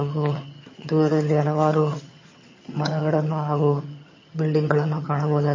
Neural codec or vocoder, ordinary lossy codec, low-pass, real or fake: vocoder, 22.05 kHz, 80 mel bands, HiFi-GAN; MP3, 32 kbps; 7.2 kHz; fake